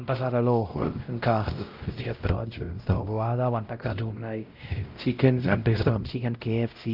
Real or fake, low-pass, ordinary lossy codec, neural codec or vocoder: fake; 5.4 kHz; Opus, 24 kbps; codec, 16 kHz, 0.5 kbps, X-Codec, WavLM features, trained on Multilingual LibriSpeech